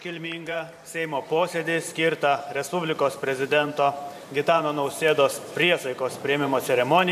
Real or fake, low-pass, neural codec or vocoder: fake; 14.4 kHz; vocoder, 44.1 kHz, 128 mel bands every 512 samples, BigVGAN v2